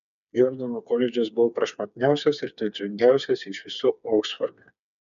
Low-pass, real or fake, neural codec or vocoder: 7.2 kHz; fake; codec, 16 kHz, 4 kbps, FreqCodec, smaller model